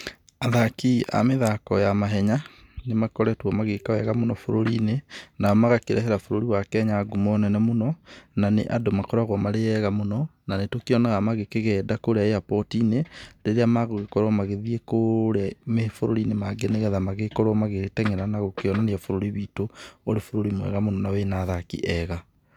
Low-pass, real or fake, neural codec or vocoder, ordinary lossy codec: 19.8 kHz; real; none; none